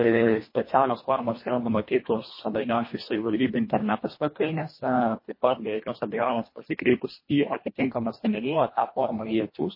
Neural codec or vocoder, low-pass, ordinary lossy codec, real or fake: codec, 24 kHz, 1.5 kbps, HILCodec; 5.4 kHz; MP3, 24 kbps; fake